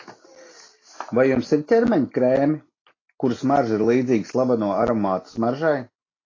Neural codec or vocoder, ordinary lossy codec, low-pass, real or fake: none; AAC, 32 kbps; 7.2 kHz; real